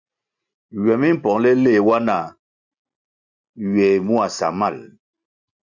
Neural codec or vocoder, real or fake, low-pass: none; real; 7.2 kHz